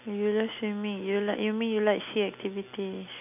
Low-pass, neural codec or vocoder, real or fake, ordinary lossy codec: 3.6 kHz; none; real; none